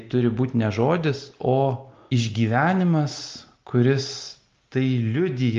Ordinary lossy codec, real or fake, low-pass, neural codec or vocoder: Opus, 32 kbps; real; 7.2 kHz; none